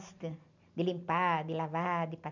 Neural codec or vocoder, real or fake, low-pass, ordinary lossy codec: none; real; 7.2 kHz; none